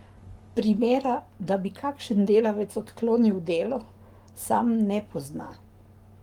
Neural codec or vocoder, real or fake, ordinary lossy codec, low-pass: codec, 44.1 kHz, 7.8 kbps, Pupu-Codec; fake; Opus, 32 kbps; 19.8 kHz